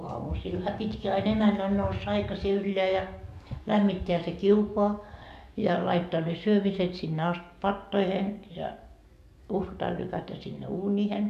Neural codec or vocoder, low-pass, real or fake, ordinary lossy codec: codec, 44.1 kHz, 7.8 kbps, DAC; 14.4 kHz; fake; MP3, 96 kbps